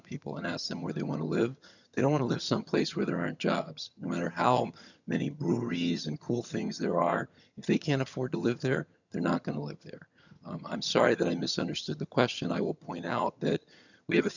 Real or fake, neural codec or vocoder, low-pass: fake; vocoder, 22.05 kHz, 80 mel bands, HiFi-GAN; 7.2 kHz